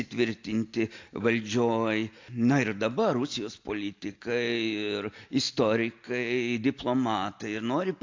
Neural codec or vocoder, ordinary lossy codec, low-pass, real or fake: none; AAC, 48 kbps; 7.2 kHz; real